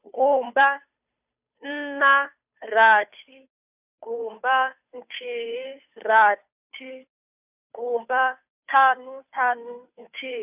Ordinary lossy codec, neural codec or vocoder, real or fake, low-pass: none; codec, 16 kHz, 2 kbps, FunCodec, trained on Chinese and English, 25 frames a second; fake; 3.6 kHz